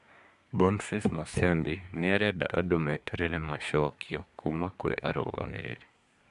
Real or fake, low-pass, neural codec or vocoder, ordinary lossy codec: fake; 10.8 kHz; codec, 24 kHz, 1 kbps, SNAC; none